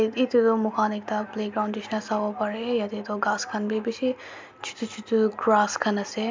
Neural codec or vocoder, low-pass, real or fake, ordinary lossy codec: none; 7.2 kHz; real; MP3, 64 kbps